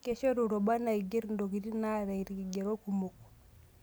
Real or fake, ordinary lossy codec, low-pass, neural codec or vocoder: real; none; none; none